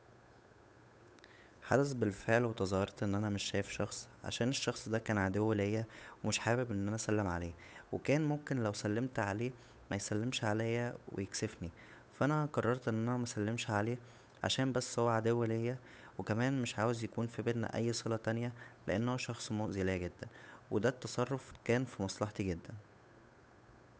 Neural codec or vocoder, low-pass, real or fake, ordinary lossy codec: codec, 16 kHz, 8 kbps, FunCodec, trained on Chinese and English, 25 frames a second; none; fake; none